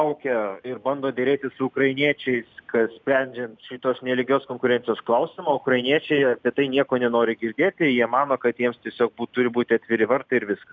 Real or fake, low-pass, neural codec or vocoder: real; 7.2 kHz; none